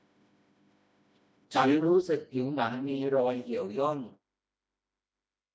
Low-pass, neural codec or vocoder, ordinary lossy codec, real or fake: none; codec, 16 kHz, 1 kbps, FreqCodec, smaller model; none; fake